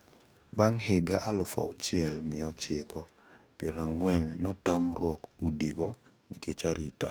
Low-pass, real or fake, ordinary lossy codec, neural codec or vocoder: none; fake; none; codec, 44.1 kHz, 2.6 kbps, DAC